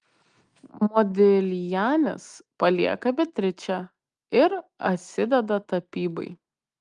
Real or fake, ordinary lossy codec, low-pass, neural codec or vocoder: real; Opus, 32 kbps; 9.9 kHz; none